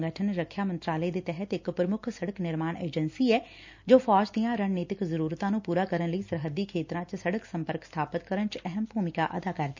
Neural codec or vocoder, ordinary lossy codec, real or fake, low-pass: none; MP3, 64 kbps; real; 7.2 kHz